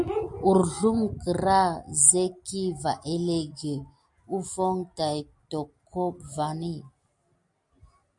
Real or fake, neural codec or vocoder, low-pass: real; none; 10.8 kHz